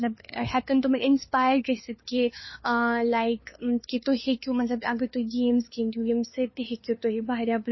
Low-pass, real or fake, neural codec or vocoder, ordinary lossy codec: 7.2 kHz; fake; codec, 16 kHz, 2 kbps, FunCodec, trained on LibriTTS, 25 frames a second; MP3, 24 kbps